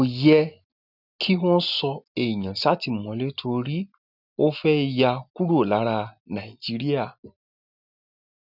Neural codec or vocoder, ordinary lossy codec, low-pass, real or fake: none; none; 5.4 kHz; real